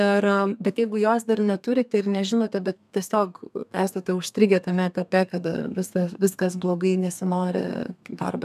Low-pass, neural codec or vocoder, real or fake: 14.4 kHz; codec, 32 kHz, 1.9 kbps, SNAC; fake